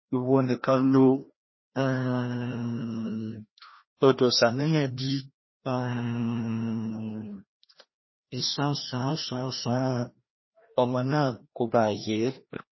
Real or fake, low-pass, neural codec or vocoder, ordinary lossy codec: fake; 7.2 kHz; codec, 16 kHz, 1 kbps, FreqCodec, larger model; MP3, 24 kbps